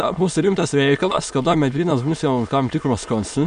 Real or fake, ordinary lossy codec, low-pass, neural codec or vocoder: fake; MP3, 64 kbps; 9.9 kHz; autoencoder, 22.05 kHz, a latent of 192 numbers a frame, VITS, trained on many speakers